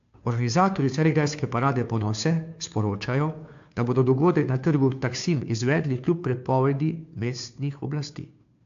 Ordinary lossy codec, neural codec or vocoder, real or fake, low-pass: AAC, 64 kbps; codec, 16 kHz, 2 kbps, FunCodec, trained on Chinese and English, 25 frames a second; fake; 7.2 kHz